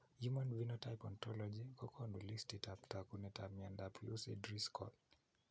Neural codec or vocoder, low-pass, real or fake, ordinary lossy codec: none; none; real; none